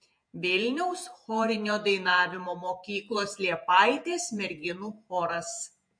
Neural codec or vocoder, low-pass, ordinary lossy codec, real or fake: vocoder, 48 kHz, 128 mel bands, Vocos; 9.9 kHz; MP3, 48 kbps; fake